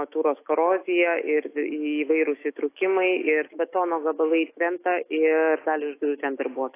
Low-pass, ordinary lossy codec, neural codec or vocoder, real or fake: 3.6 kHz; AAC, 24 kbps; autoencoder, 48 kHz, 128 numbers a frame, DAC-VAE, trained on Japanese speech; fake